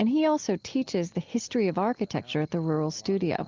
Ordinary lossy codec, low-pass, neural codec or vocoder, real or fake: Opus, 32 kbps; 7.2 kHz; none; real